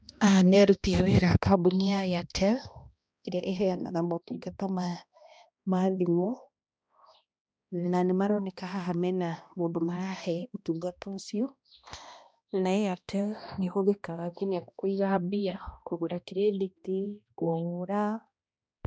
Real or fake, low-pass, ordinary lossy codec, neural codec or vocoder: fake; none; none; codec, 16 kHz, 1 kbps, X-Codec, HuBERT features, trained on balanced general audio